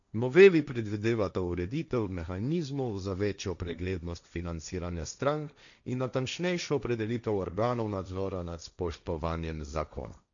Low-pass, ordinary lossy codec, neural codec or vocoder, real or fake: 7.2 kHz; none; codec, 16 kHz, 1.1 kbps, Voila-Tokenizer; fake